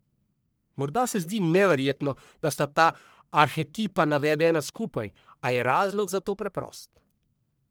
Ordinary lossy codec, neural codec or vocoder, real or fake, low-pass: none; codec, 44.1 kHz, 1.7 kbps, Pupu-Codec; fake; none